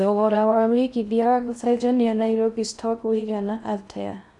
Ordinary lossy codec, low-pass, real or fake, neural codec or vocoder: none; 10.8 kHz; fake; codec, 16 kHz in and 24 kHz out, 0.6 kbps, FocalCodec, streaming, 4096 codes